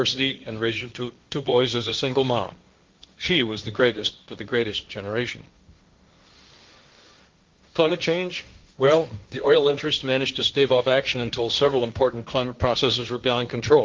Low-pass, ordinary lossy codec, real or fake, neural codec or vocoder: 7.2 kHz; Opus, 32 kbps; fake; codec, 16 kHz, 1.1 kbps, Voila-Tokenizer